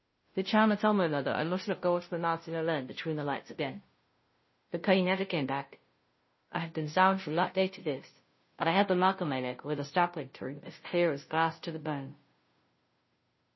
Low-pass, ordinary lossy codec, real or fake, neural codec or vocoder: 7.2 kHz; MP3, 24 kbps; fake; codec, 16 kHz, 0.5 kbps, FunCodec, trained on Chinese and English, 25 frames a second